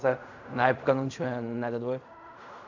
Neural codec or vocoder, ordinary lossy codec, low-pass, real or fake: codec, 16 kHz in and 24 kHz out, 0.4 kbps, LongCat-Audio-Codec, fine tuned four codebook decoder; none; 7.2 kHz; fake